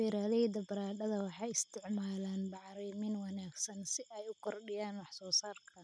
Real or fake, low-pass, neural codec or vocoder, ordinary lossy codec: real; none; none; none